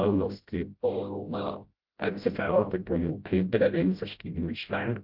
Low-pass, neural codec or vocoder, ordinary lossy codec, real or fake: 5.4 kHz; codec, 16 kHz, 0.5 kbps, FreqCodec, smaller model; Opus, 32 kbps; fake